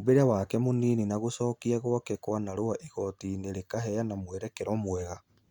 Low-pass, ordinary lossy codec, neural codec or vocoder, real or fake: 19.8 kHz; Opus, 64 kbps; none; real